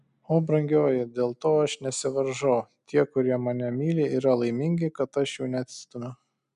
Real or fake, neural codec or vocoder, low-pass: real; none; 10.8 kHz